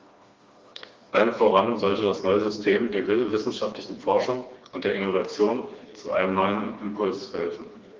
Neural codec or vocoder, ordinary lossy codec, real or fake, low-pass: codec, 16 kHz, 2 kbps, FreqCodec, smaller model; Opus, 32 kbps; fake; 7.2 kHz